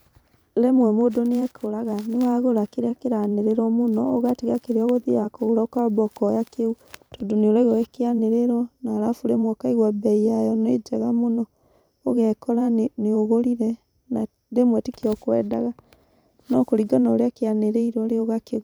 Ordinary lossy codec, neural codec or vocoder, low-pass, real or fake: none; vocoder, 44.1 kHz, 128 mel bands every 512 samples, BigVGAN v2; none; fake